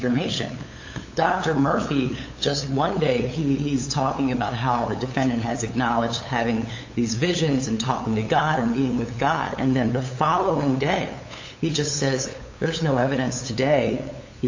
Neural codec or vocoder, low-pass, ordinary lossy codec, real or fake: codec, 16 kHz, 8 kbps, FunCodec, trained on LibriTTS, 25 frames a second; 7.2 kHz; AAC, 48 kbps; fake